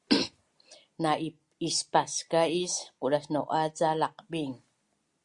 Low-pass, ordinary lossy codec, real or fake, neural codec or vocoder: 10.8 kHz; Opus, 64 kbps; real; none